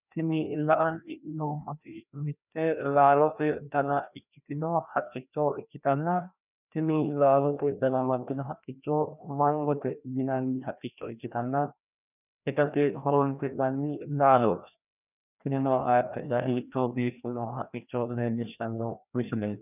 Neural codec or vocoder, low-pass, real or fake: codec, 16 kHz, 1 kbps, FreqCodec, larger model; 3.6 kHz; fake